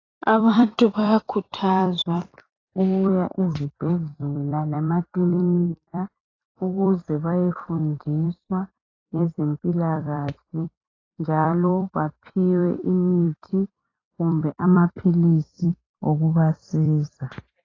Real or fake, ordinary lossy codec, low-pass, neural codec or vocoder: fake; AAC, 32 kbps; 7.2 kHz; vocoder, 44.1 kHz, 128 mel bands every 256 samples, BigVGAN v2